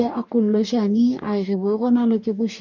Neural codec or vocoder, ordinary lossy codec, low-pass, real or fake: codec, 44.1 kHz, 2.6 kbps, DAC; none; 7.2 kHz; fake